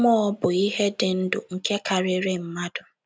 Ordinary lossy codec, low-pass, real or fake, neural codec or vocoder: none; none; real; none